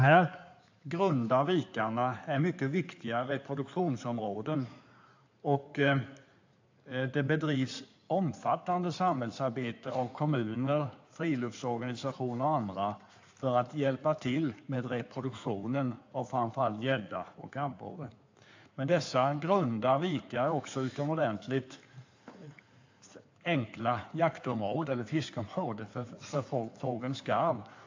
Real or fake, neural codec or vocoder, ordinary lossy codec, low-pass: fake; codec, 16 kHz in and 24 kHz out, 2.2 kbps, FireRedTTS-2 codec; AAC, 48 kbps; 7.2 kHz